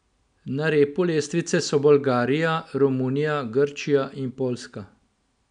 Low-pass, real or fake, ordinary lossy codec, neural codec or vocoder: 9.9 kHz; real; none; none